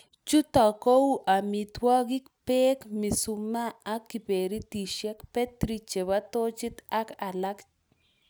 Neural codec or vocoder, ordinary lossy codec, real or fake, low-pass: none; none; real; none